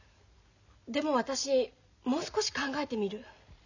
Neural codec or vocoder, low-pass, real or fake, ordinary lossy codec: none; 7.2 kHz; real; none